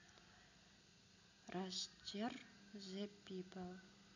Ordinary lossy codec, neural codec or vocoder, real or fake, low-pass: none; none; real; 7.2 kHz